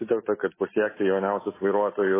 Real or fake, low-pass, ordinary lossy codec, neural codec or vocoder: real; 3.6 kHz; MP3, 16 kbps; none